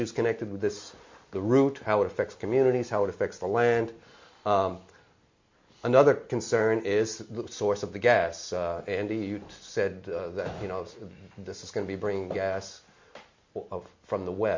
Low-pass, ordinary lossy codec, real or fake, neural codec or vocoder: 7.2 kHz; MP3, 48 kbps; real; none